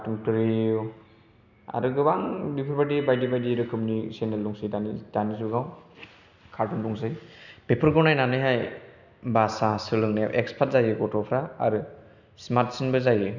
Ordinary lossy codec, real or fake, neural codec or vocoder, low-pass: none; real; none; 7.2 kHz